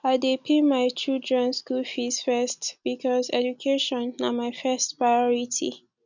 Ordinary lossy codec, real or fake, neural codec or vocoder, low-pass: none; real; none; 7.2 kHz